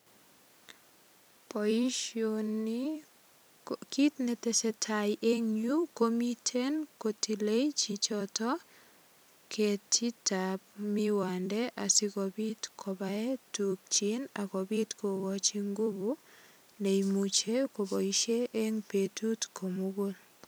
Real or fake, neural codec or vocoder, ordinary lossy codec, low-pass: fake; vocoder, 44.1 kHz, 128 mel bands every 256 samples, BigVGAN v2; none; none